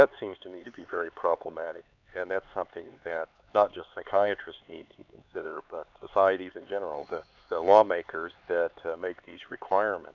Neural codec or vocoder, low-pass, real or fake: codec, 16 kHz, 4 kbps, X-Codec, HuBERT features, trained on LibriSpeech; 7.2 kHz; fake